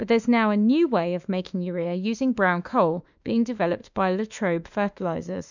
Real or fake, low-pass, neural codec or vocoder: fake; 7.2 kHz; autoencoder, 48 kHz, 32 numbers a frame, DAC-VAE, trained on Japanese speech